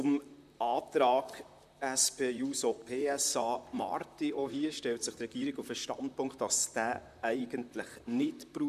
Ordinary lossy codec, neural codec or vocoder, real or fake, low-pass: none; vocoder, 44.1 kHz, 128 mel bands, Pupu-Vocoder; fake; 14.4 kHz